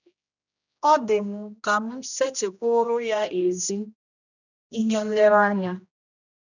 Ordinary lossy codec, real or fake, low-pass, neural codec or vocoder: none; fake; 7.2 kHz; codec, 16 kHz, 1 kbps, X-Codec, HuBERT features, trained on general audio